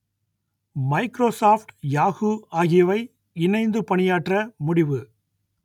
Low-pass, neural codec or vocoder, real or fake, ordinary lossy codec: 19.8 kHz; none; real; none